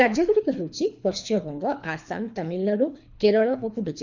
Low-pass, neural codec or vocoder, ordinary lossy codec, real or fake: 7.2 kHz; codec, 24 kHz, 3 kbps, HILCodec; none; fake